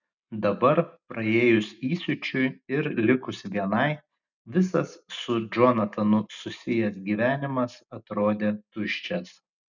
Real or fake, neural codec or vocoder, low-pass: real; none; 7.2 kHz